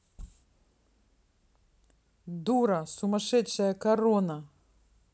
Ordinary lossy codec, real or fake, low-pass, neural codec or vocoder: none; real; none; none